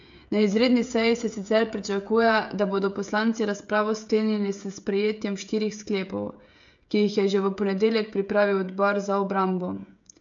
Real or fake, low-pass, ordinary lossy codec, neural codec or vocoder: fake; 7.2 kHz; MP3, 64 kbps; codec, 16 kHz, 16 kbps, FreqCodec, smaller model